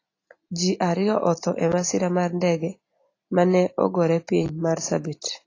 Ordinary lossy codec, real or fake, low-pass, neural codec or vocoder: AAC, 32 kbps; real; 7.2 kHz; none